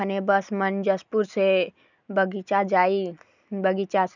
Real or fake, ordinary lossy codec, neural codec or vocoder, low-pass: real; none; none; 7.2 kHz